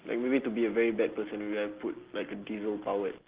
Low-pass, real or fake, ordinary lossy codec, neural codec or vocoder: 3.6 kHz; real; Opus, 16 kbps; none